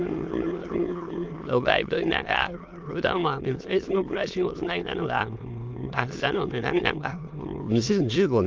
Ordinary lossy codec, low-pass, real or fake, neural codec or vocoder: Opus, 32 kbps; 7.2 kHz; fake; autoencoder, 22.05 kHz, a latent of 192 numbers a frame, VITS, trained on many speakers